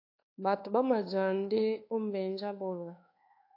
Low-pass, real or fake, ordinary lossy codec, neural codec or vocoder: 5.4 kHz; fake; AAC, 32 kbps; codec, 24 kHz, 1.2 kbps, DualCodec